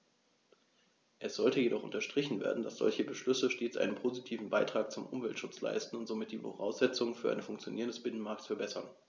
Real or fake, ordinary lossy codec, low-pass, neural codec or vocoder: real; none; none; none